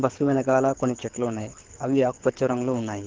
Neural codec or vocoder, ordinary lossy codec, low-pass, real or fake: codec, 24 kHz, 6 kbps, HILCodec; Opus, 16 kbps; 7.2 kHz; fake